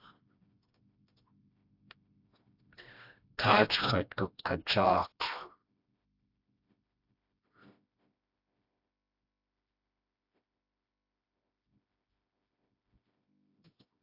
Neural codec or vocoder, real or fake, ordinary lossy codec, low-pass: codec, 16 kHz, 1 kbps, FreqCodec, smaller model; fake; AAC, 48 kbps; 5.4 kHz